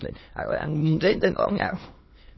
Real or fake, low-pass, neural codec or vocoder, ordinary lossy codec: fake; 7.2 kHz; autoencoder, 22.05 kHz, a latent of 192 numbers a frame, VITS, trained on many speakers; MP3, 24 kbps